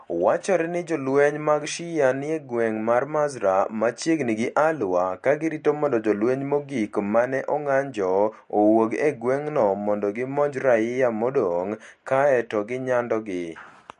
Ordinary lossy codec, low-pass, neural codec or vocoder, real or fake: MP3, 48 kbps; 14.4 kHz; none; real